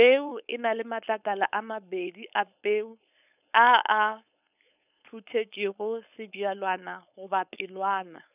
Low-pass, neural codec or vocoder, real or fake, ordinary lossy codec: 3.6 kHz; codec, 16 kHz, 4.8 kbps, FACodec; fake; none